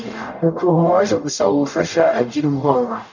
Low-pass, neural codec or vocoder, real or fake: 7.2 kHz; codec, 44.1 kHz, 0.9 kbps, DAC; fake